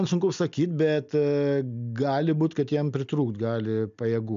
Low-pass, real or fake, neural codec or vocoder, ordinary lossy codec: 7.2 kHz; real; none; MP3, 64 kbps